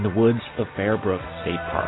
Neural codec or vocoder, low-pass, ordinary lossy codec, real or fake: none; 7.2 kHz; AAC, 16 kbps; real